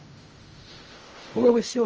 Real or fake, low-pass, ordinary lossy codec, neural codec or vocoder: fake; 7.2 kHz; Opus, 24 kbps; codec, 16 kHz in and 24 kHz out, 0.4 kbps, LongCat-Audio-Codec, fine tuned four codebook decoder